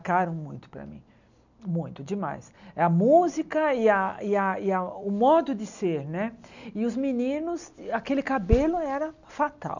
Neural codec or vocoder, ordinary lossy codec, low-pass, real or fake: none; none; 7.2 kHz; real